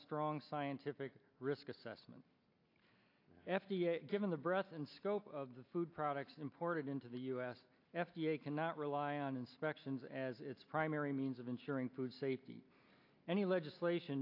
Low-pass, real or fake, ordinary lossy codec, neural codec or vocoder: 5.4 kHz; real; AAC, 32 kbps; none